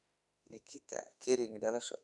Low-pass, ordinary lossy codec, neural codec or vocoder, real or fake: 10.8 kHz; none; autoencoder, 48 kHz, 32 numbers a frame, DAC-VAE, trained on Japanese speech; fake